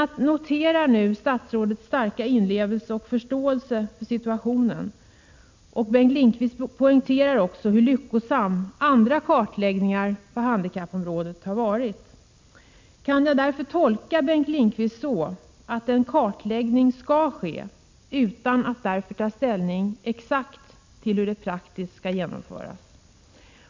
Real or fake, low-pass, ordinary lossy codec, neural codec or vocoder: real; 7.2 kHz; MP3, 64 kbps; none